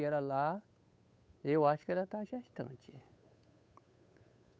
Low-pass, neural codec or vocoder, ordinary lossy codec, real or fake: none; codec, 16 kHz, 8 kbps, FunCodec, trained on Chinese and English, 25 frames a second; none; fake